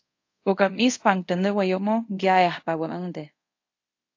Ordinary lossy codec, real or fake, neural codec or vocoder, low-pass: AAC, 48 kbps; fake; codec, 24 kHz, 0.5 kbps, DualCodec; 7.2 kHz